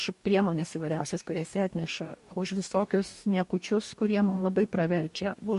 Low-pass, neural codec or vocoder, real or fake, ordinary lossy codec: 14.4 kHz; codec, 44.1 kHz, 2.6 kbps, DAC; fake; MP3, 48 kbps